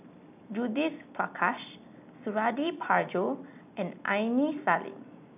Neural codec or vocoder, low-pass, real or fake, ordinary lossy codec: none; 3.6 kHz; real; none